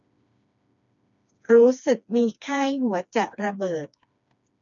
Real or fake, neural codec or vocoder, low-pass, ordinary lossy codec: fake; codec, 16 kHz, 2 kbps, FreqCodec, smaller model; 7.2 kHz; none